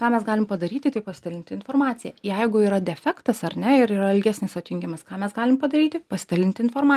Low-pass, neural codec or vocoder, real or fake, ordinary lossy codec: 14.4 kHz; none; real; Opus, 32 kbps